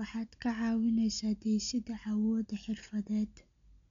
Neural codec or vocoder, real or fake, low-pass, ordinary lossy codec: none; real; 7.2 kHz; MP3, 64 kbps